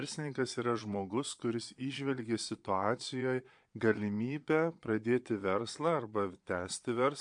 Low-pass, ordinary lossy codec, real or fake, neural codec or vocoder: 9.9 kHz; MP3, 64 kbps; fake; vocoder, 22.05 kHz, 80 mel bands, Vocos